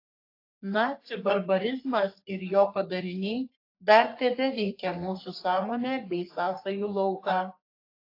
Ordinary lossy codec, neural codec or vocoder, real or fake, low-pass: AAC, 32 kbps; codec, 44.1 kHz, 3.4 kbps, Pupu-Codec; fake; 5.4 kHz